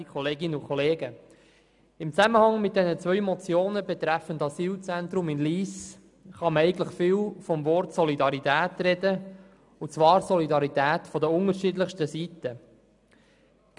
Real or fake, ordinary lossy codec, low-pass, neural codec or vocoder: real; none; 10.8 kHz; none